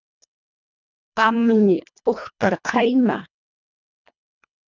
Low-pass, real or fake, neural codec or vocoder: 7.2 kHz; fake; codec, 24 kHz, 1.5 kbps, HILCodec